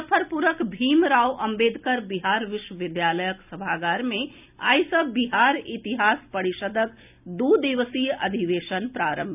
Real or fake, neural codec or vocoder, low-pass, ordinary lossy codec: real; none; 3.6 kHz; none